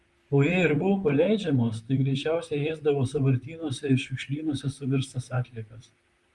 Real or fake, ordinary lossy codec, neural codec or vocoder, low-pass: fake; Opus, 32 kbps; vocoder, 44.1 kHz, 128 mel bands, Pupu-Vocoder; 10.8 kHz